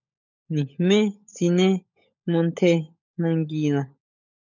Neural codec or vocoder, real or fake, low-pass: codec, 16 kHz, 16 kbps, FunCodec, trained on LibriTTS, 50 frames a second; fake; 7.2 kHz